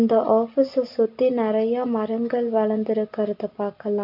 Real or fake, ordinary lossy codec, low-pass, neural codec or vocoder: real; MP3, 48 kbps; 5.4 kHz; none